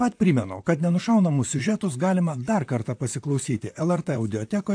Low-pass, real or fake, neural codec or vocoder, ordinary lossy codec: 9.9 kHz; fake; vocoder, 44.1 kHz, 128 mel bands, Pupu-Vocoder; AAC, 64 kbps